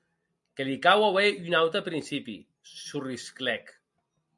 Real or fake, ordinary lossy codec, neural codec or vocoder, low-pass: real; MP3, 64 kbps; none; 10.8 kHz